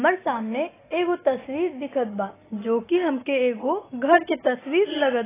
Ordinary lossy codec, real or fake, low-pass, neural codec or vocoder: AAC, 16 kbps; real; 3.6 kHz; none